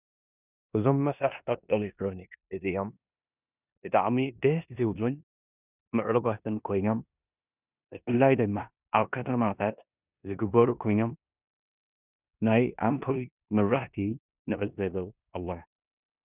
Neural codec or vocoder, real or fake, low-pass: codec, 16 kHz in and 24 kHz out, 0.9 kbps, LongCat-Audio-Codec, four codebook decoder; fake; 3.6 kHz